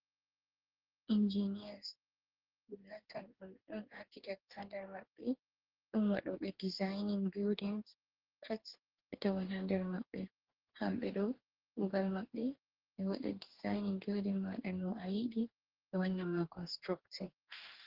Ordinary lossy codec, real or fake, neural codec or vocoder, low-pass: Opus, 16 kbps; fake; codec, 44.1 kHz, 2.6 kbps, DAC; 5.4 kHz